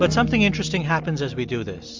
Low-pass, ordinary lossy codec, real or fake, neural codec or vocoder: 7.2 kHz; MP3, 64 kbps; real; none